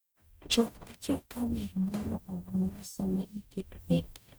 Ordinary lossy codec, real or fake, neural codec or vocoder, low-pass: none; fake; codec, 44.1 kHz, 0.9 kbps, DAC; none